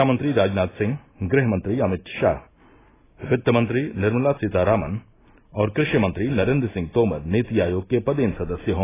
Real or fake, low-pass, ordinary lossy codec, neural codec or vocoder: real; 3.6 kHz; AAC, 16 kbps; none